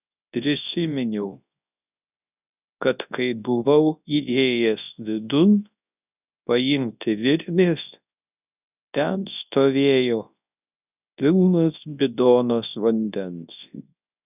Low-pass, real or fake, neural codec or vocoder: 3.6 kHz; fake; codec, 24 kHz, 0.9 kbps, WavTokenizer, large speech release